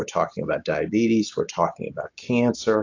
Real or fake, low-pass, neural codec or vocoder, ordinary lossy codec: real; 7.2 kHz; none; AAC, 48 kbps